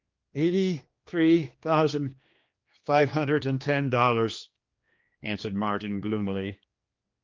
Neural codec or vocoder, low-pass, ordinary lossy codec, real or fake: codec, 16 kHz, 2 kbps, X-Codec, HuBERT features, trained on general audio; 7.2 kHz; Opus, 24 kbps; fake